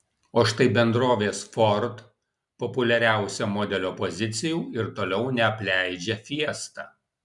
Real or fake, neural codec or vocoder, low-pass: real; none; 10.8 kHz